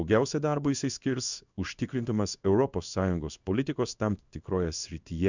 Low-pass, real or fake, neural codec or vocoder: 7.2 kHz; fake; codec, 16 kHz in and 24 kHz out, 1 kbps, XY-Tokenizer